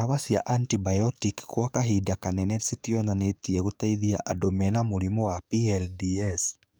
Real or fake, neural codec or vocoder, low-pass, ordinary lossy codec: fake; codec, 44.1 kHz, 7.8 kbps, DAC; none; none